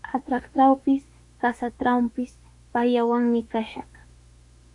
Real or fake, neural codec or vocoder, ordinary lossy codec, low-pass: fake; autoencoder, 48 kHz, 32 numbers a frame, DAC-VAE, trained on Japanese speech; AAC, 64 kbps; 10.8 kHz